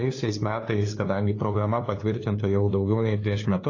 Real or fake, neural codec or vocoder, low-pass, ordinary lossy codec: fake; codec, 16 kHz, 2 kbps, FunCodec, trained on LibriTTS, 25 frames a second; 7.2 kHz; AAC, 32 kbps